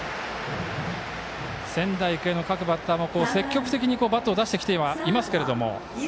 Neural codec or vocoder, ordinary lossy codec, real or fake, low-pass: none; none; real; none